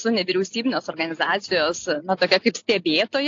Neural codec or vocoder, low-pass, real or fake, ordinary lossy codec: none; 7.2 kHz; real; AAC, 48 kbps